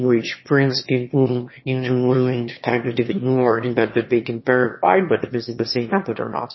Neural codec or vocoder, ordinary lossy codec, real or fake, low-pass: autoencoder, 22.05 kHz, a latent of 192 numbers a frame, VITS, trained on one speaker; MP3, 24 kbps; fake; 7.2 kHz